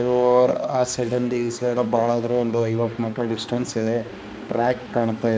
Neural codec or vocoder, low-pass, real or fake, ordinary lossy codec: codec, 16 kHz, 2 kbps, X-Codec, HuBERT features, trained on balanced general audio; none; fake; none